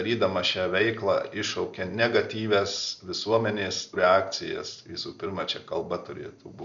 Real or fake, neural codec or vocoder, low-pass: real; none; 7.2 kHz